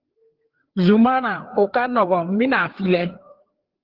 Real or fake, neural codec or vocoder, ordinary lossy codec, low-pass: fake; codec, 16 kHz, 4 kbps, FreqCodec, larger model; Opus, 16 kbps; 5.4 kHz